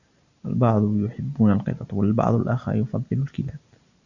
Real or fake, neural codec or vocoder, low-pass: real; none; 7.2 kHz